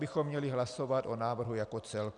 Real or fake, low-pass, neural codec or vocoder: real; 9.9 kHz; none